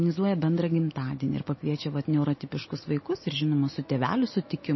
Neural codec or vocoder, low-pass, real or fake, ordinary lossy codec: none; 7.2 kHz; real; MP3, 24 kbps